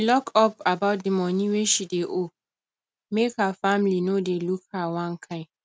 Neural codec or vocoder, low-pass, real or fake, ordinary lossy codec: none; none; real; none